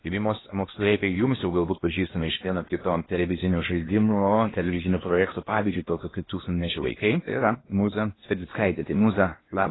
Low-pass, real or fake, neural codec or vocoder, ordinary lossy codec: 7.2 kHz; fake; codec, 16 kHz in and 24 kHz out, 0.8 kbps, FocalCodec, streaming, 65536 codes; AAC, 16 kbps